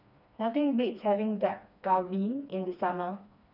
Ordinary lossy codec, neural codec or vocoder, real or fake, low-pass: none; codec, 16 kHz, 2 kbps, FreqCodec, smaller model; fake; 5.4 kHz